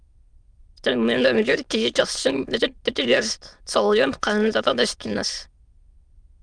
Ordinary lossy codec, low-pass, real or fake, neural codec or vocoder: Opus, 32 kbps; 9.9 kHz; fake; autoencoder, 22.05 kHz, a latent of 192 numbers a frame, VITS, trained on many speakers